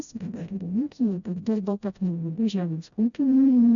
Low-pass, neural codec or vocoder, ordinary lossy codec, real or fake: 7.2 kHz; codec, 16 kHz, 0.5 kbps, FreqCodec, smaller model; MP3, 96 kbps; fake